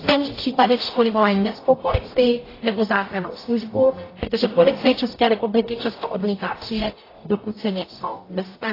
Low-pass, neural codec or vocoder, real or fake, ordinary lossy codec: 5.4 kHz; codec, 44.1 kHz, 0.9 kbps, DAC; fake; AAC, 24 kbps